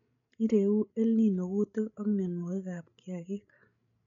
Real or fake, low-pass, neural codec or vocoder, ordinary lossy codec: fake; 7.2 kHz; codec, 16 kHz, 16 kbps, FreqCodec, larger model; none